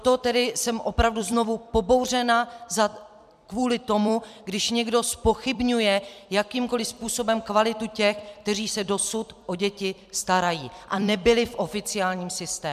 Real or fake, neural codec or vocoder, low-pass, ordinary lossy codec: fake; vocoder, 44.1 kHz, 128 mel bands every 256 samples, BigVGAN v2; 14.4 kHz; MP3, 96 kbps